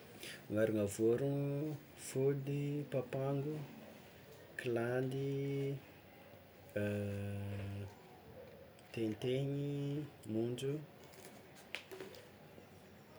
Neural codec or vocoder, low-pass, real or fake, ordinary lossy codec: none; none; real; none